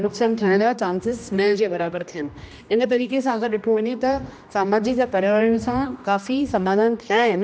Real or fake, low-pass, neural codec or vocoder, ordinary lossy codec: fake; none; codec, 16 kHz, 1 kbps, X-Codec, HuBERT features, trained on general audio; none